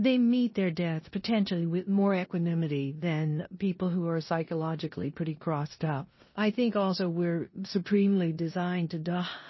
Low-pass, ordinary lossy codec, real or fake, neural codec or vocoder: 7.2 kHz; MP3, 24 kbps; fake; codec, 16 kHz in and 24 kHz out, 0.9 kbps, LongCat-Audio-Codec, four codebook decoder